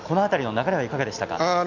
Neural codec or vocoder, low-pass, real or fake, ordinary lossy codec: none; 7.2 kHz; real; none